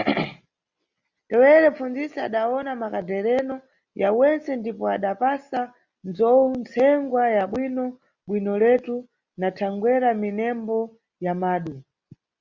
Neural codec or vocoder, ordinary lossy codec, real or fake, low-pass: none; Opus, 64 kbps; real; 7.2 kHz